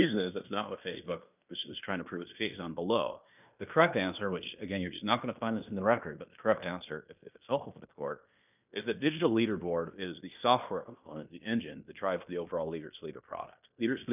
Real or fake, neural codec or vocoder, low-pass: fake; codec, 16 kHz in and 24 kHz out, 0.9 kbps, LongCat-Audio-Codec, fine tuned four codebook decoder; 3.6 kHz